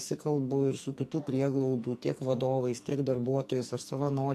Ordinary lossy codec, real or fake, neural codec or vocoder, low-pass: AAC, 64 kbps; fake; codec, 44.1 kHz, 2.6 kbps, SNAC; 14.4 kHz